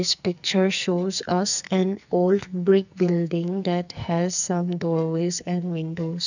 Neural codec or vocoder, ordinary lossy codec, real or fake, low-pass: codec, 44.1 kHz, 2.6 kbps, SNAC; none; fake; 7.2 kHz